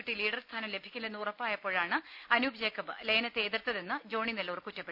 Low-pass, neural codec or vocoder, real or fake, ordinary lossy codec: 5.4 kHz; none; real; none